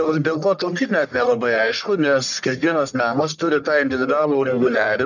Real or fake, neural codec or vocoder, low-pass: fake; codec, 44.1 kHz, 1.7 kbps, Pupu-Codec; 7.2 kHz